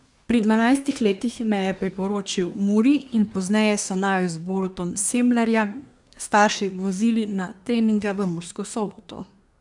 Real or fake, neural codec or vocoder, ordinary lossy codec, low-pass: fake; codec, 24 kHz, 1 kbps, SNAC; none; 10.8 kHz